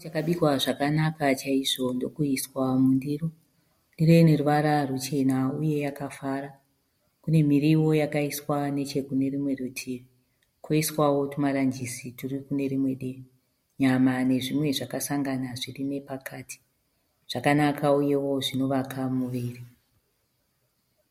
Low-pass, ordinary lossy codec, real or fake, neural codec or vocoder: 19.8 kHz; MP3, 64 kbps; real; none